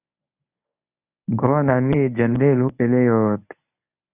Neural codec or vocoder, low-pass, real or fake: codec, 24 kHz, 0.9 kbps, WavTokenizer, medium speech release version 1; 3.6 kHz; fake